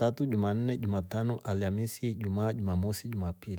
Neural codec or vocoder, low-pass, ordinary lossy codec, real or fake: autoencoder, 48 kHz, 128 numbers a frame, DAC-VAE, trained on Japanese speech; none; none; fake